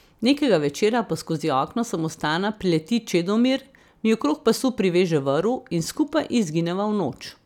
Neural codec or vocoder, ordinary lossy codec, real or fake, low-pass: none; none; real; 19.8 kHz